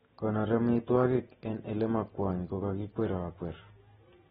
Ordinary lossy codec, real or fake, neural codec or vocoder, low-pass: AAC, 16 kbps; real; none; 19.8 kHz